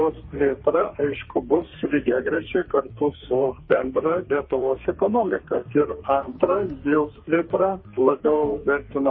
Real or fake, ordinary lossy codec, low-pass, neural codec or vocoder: fake; MP3, 24 kbps; 7.2 kHz; codec, 32 kHz, 1.9 kbps, SNAC